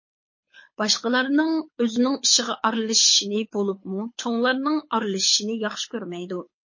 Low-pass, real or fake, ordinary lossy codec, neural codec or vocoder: 7.2 kHz; fake; MP3, 32 kbps; codec, 24 kHz, 6 kbps, HILCodec